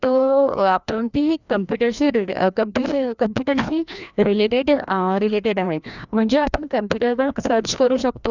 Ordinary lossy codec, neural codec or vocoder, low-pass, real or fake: none; codec, 16 kHz, 1 kbps, FreqCodec, larger model; 7.2 kHz; fake